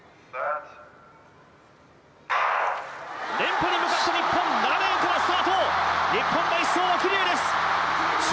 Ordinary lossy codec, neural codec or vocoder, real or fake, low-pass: none; none; real; none